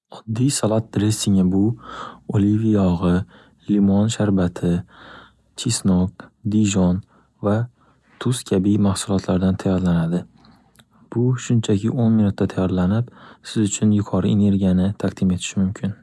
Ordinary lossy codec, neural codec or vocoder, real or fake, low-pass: none; none; real; none